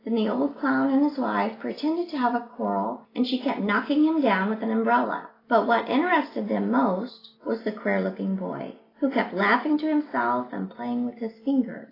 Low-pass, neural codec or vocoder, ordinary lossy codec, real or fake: 5.4 kHz; none; AAC, 24 kbps; real